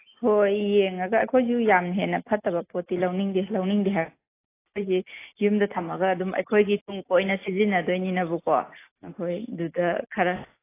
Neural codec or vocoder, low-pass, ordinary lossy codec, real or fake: none; 3.6 kHz; AAC, 24 kbps; real